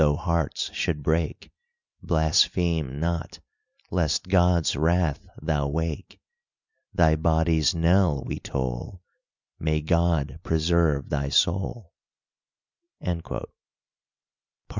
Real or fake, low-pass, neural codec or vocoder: real; 7.2 kHz; none